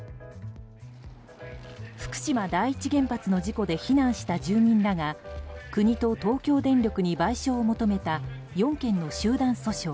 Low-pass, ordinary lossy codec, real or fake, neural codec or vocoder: none; none; real; none